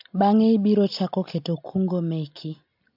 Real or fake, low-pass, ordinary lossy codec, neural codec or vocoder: real; 5.4 kHz; AAC, 48 kbps; none